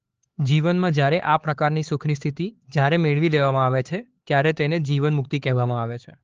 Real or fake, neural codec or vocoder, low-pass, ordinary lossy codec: fake; codec, 16 kHz, 4 kbps, X-Codec, HuBERT features, trained on LibriSpeech; 7.2 kHz; Opus, 16 kbps